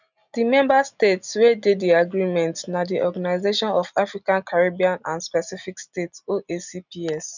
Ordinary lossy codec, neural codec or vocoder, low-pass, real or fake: none; none; 7.2 kHz; real